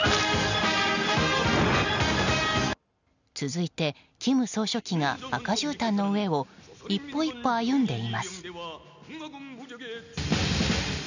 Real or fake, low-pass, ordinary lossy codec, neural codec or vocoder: real; 7.2 kHz; none; none